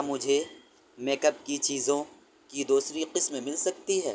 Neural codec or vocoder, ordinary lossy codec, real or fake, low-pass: none; none; real; none